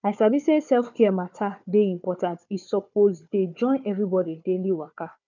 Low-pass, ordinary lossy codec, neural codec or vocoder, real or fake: 7.2 kHz; none; codec, 16 kHz, 4 kbps, FunCodec, trained on Chinese and English, 50 frames a second; fake